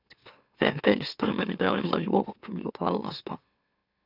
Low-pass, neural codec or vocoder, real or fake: 5.4 kHz; autoencoder, 44.1 kHz, a latent of 192 numbers a frame, MeloTTS; fake